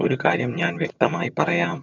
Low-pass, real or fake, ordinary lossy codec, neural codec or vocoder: 7.2 kHz; fake; none; vocoder, 22.05 kHz, 80 mel bands, HiFi-GAN